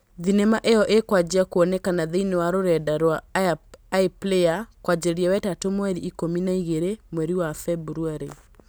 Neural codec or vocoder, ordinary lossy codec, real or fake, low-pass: none; none; real; none